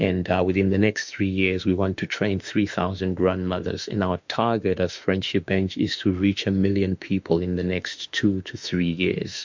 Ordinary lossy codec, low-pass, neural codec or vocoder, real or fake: MP3, 64 kbps; 7.2 kHz; autoencoder, 48 kHz, 32 numbers a frame, DAC-VAE, trained on Japanese speech; fake